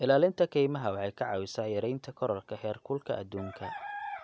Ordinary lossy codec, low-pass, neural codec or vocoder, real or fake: none; none; none; real